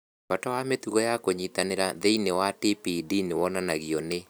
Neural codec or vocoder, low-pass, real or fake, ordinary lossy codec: none; none; real; none